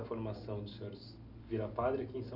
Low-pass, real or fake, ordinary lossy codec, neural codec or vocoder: 5.4 kHz; real; none; none